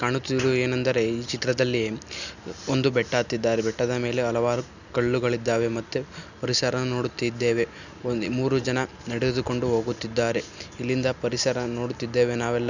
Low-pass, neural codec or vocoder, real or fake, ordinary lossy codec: 7.2 kHz; none; real; none